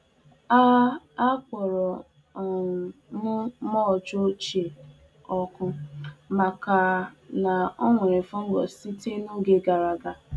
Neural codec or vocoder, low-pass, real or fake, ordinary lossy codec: none; none; real; none